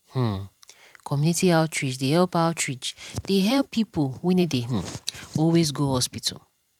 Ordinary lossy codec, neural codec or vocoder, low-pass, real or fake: none; vocoder, 44.1 kHz, 128 mel bands every 512 samples, BigVGAN v2; 19.8 kHz; fake